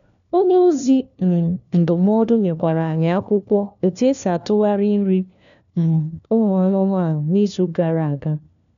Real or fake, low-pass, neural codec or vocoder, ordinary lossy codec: fake; 7.2 kHz; codec, 16 kHz, 1 kbps, FunCodec, trained on LibriTTS, 50 frames a second; none